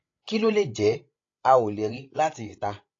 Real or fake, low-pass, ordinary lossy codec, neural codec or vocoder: fake; 7.2 kHz; AAC, 32 kbps; codec, 16 kHz, 16 kbps, FreqCodec, larger model